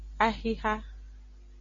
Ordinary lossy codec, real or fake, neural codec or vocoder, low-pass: MP3, 32 kbps; real; none; 7.2 kHz